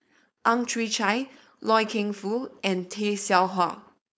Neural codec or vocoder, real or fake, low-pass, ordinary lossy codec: codec, 16 kHz, 4.8 kbps, FACodec; fake; none; none